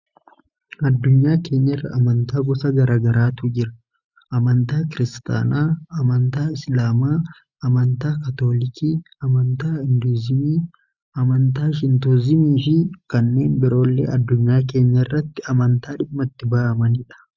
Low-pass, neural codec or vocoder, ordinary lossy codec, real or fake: 7.2 kHz; none; Opus, 64 kbps; real